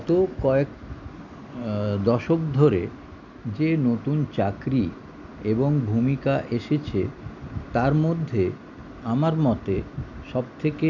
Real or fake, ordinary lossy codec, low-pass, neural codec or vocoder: real; none; 7.2 kHz; none